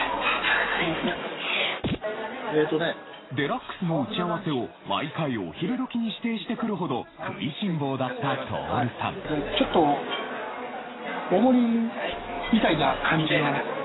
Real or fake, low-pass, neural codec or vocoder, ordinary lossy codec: real; 7.2 kHz; none; AAC, 16 kbps